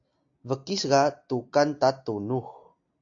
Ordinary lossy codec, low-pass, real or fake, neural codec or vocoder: AAC, 48 kbps; 7.2 kHz; real; none